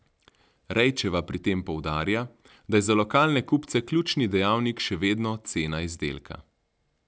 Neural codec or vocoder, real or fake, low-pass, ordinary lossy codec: none; real; none; none